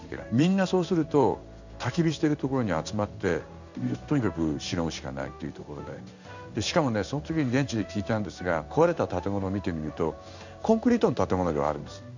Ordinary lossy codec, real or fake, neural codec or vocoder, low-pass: none; fake; codec, 16 kHz in and 24 kHz out, 1 kbps, XY-Tokenizer; 7.2 kHz